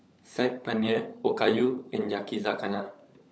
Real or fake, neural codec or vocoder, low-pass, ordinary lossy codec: fake; codec, 16 kHz, 16 kbps, FunCodec, trained on LibriTTS, 50 frames a second; none; none